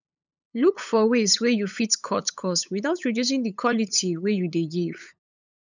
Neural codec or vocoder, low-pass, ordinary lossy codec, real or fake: codec, 16 kHz, 8 kbps, FunCodec, trained on LibriTTS, 25 frames a second; 7.2 kHz; none; fake